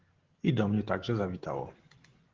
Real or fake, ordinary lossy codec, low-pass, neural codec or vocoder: real; Opus, 16 kbps; 7.2 kHz; none